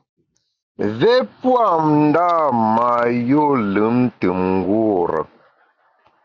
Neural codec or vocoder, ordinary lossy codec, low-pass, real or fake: none; Opus, 64 kbps; 7.2 kHz; real